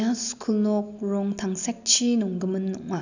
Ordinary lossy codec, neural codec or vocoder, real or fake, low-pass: none; none; real; 7.2 kHz